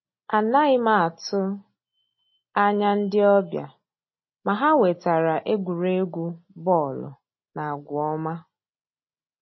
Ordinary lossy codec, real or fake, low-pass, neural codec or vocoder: MP3, 24 kbps; real; 7.2 kHz; none